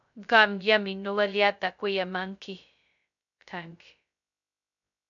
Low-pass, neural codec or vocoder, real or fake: 7.2 kHz; codec, 16 kHz, 0.2 kbps, FocalCodec; fake